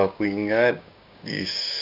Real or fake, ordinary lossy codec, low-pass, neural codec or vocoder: fake; none; 5.4 kHz; codec, 16 kHz, 6 kbps, DAC